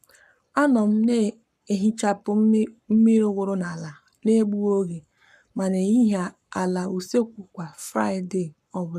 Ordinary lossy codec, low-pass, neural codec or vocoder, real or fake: none; 14.4 kHz; codec, 44.1 kHz, 7.8 kbps, Pupu-Codec; fake